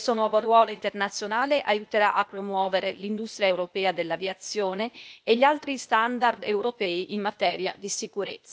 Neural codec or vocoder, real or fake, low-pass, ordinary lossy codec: codec, 16 kHz, 0.8 kbps, ZipCodec; fake; none; none